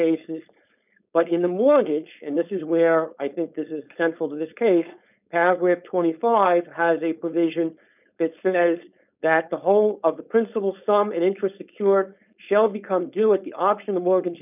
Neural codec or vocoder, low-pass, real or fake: codec, 16 kHz, 4.8 kbps, FACodec; 3.6 kHz; fake